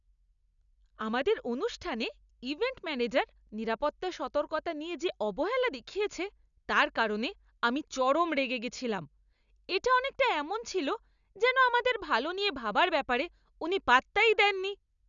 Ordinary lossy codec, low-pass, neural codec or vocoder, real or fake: none; 7.2 kHz; none; real